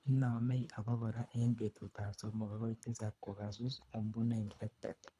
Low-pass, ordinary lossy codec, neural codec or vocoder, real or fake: none; none; codec, 24 kHz, 3 kbps, HILCodec; fake